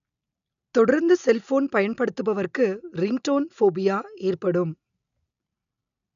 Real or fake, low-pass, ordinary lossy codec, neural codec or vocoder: real; 7.2 kHz; none; none